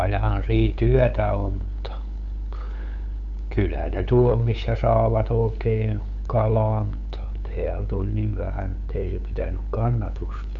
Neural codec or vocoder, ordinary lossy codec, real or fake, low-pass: codec, 16 kHz, 8 kbps, FunCodec, trained on LibriTTS, 25 frames a second; none; fake; 7.2 kHz